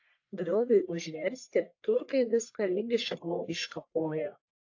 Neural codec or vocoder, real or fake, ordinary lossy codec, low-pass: codec, 44.1 kHz, 1.7 kbps, Pupu-Codec; fake; AAC, 48 kbps; 7.2 kHz